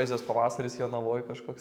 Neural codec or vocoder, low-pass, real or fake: codec, 44.1 kHz, 7.8 kbps, DAC; 19.8 kHz; fake